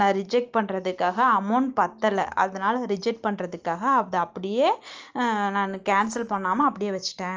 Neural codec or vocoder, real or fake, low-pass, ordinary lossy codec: none; real; 7.2 kHz; Opus, 24 kbps